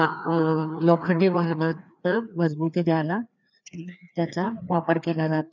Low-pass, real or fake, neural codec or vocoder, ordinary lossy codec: 7.2 kHz; fake; codec, 16 kHz, 2 kbps, FreqCodec, larger model; none